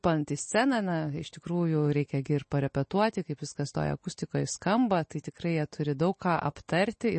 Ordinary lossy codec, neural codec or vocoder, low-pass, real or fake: MP3, 32 kbps; none; 10.8 kHz; real